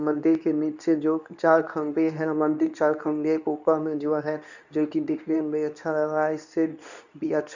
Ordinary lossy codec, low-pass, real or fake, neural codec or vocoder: none; 7.2 kHz; fake; codec, 24 kHz, 0.9 kbps, WavTokenizer, medium speech release version 2